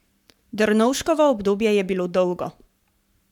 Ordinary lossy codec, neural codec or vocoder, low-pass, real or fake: none; codec, 44.1 kHz, 7.8 kbps, Pupu-Codec; 19.8 kHz; fake